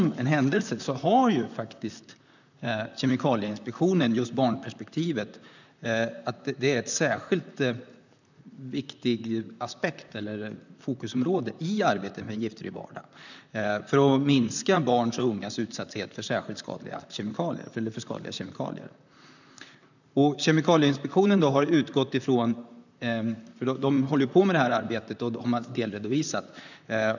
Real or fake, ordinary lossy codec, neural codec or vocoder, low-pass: fake; none; vocoder, 44.1 kHz, 128 mel bands, Pupu-Vocoder; 7.2 kHz